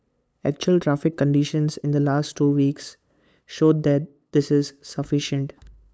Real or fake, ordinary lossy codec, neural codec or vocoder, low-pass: fake; none; codec, 16 kHz, 8 kbps, FunCodec, trained on LibriTTS, 25 frames a second; none